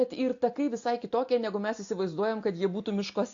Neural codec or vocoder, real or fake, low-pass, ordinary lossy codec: none; real; 7.2 kHz; AAC, 48 kbps